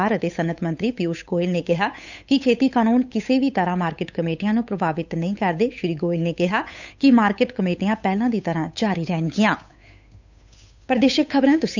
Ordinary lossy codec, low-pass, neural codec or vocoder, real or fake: none; 7.2 kHz; codec, 16 kHz, 8 kbps, FunCodec, trained on Chinese and English, 25 frames a second; fake